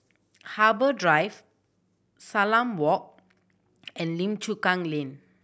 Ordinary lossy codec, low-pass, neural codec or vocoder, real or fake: none; none; none; real